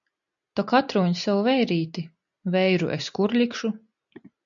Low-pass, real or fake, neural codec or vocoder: 7.2 kHz; real; none